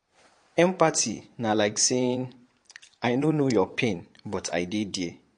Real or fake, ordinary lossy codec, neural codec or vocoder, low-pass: fake; MP3, 48 kbps; vocoder, 22.05 kHz, 80 mel bands, Vocos; 9.9 kHz